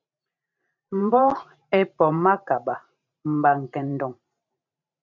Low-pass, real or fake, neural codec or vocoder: 7.2 kHz; fake; vocoder, 44.1 kHz, 128 mel bands every 512 samples, BigVGAN v2